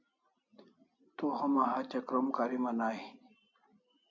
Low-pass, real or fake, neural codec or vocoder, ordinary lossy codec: 7.2 kHz; real; none; MP3, 64 kbps